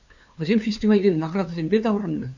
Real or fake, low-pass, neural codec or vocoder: fake; 7.2 kHz; codec, 16 kHz, 2 kbps, FunCodec, trained on LibriTTS, 25 frames a second